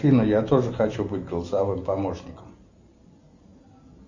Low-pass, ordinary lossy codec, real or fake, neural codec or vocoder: 7.2 kHz; Opus, 64 kbps; real; none